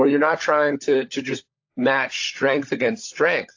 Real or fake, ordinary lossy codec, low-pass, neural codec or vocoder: fake; AAC, 48 kbps; 7.2 kHz; codec, 16 kHz, 16 kbps, FunCodec, trained on LibriTTS, 50 frames a second